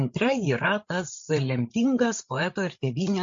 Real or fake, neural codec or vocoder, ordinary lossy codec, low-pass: fake; codec, 16 kHz, 8 kbps, FreqCodec, larger model; MP3, 48 kbps; 7.2 kHz